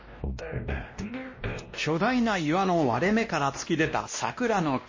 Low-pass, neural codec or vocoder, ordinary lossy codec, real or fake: 7.2 kHz; codec, 16 kHz, 1 kbps, X-Codec, WavLM features, trained on Multilingual LibriSpeech; MP3, 32 kbps; fake